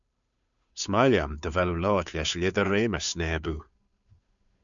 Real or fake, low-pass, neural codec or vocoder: fake; 7.2 kHz; codec, 16 kHz, 2 kbps, FunCodec, trained on Chinese and English, 25 frames a second